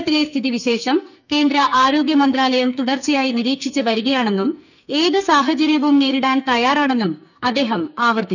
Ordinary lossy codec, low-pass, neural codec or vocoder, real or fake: none; 7.2 kHz; codec, 44.1 kHz, 2.6 kbps, SNAC; fake